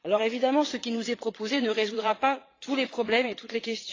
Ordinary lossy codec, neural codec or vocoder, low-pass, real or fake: AAC, 32 kbps; vocoder, 22.05 kHz, 80 mel bands, Vocos; 7.2 kHz; fake